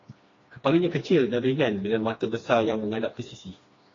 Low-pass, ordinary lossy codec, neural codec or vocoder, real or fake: 7.2 kHz; AAC, 32 kbps; codec, 16 kHz, 2 kbps, FreqCodec, smaller model; fake